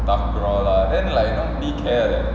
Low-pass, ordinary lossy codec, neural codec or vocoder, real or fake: none; none; none; real